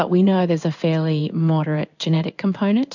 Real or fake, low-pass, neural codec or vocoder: fake; 7.2 kHz; codec, 16 kHz in and 24 kHz out, 1 kbps, XY-Tokenizer